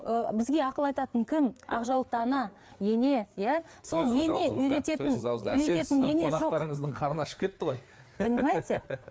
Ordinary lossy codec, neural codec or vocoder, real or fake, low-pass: none; codec, 16 kHz, 4 kbps, FreqCodec, larger model; fake; none